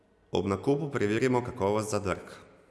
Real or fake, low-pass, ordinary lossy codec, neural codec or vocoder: real; none; none; none